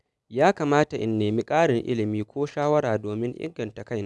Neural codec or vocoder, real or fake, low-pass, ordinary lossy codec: none; real; none; none